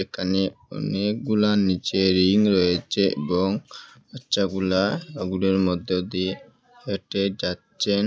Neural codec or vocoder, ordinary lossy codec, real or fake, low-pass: none; none; real; none